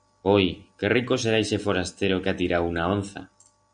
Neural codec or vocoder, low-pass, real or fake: none; 9.9 kHz; real